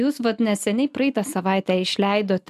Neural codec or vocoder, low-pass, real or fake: none; 14.4 kHz; real